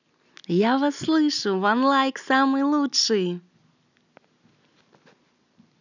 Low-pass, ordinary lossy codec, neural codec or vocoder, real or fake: 7.2 kHz; none; none; real